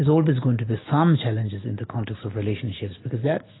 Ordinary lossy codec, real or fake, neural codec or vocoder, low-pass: AAC, 16 kbps; real; none; 7.2 kHz